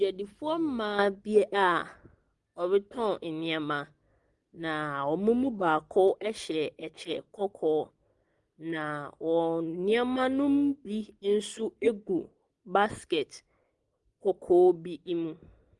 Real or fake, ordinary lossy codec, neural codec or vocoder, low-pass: real; Opus, 24 kbps; none; 10.8 kHz